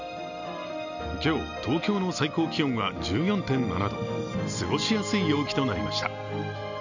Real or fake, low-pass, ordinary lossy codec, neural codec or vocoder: real; 7.2 kHz; none; none